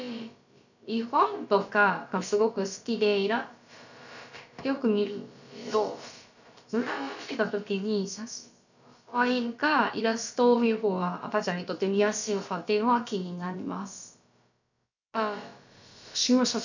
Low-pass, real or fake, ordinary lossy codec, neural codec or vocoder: 7.2 kHz; fake; none; codec, 16 kHz, about 1 kbps, DyCAST, with the encoder's durations